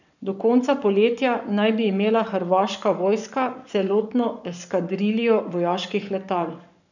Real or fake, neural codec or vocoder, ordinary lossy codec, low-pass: fake; codec, 44.1 kHz, 7.8 kbps, Pupu-Codec; none; 7.2 kHz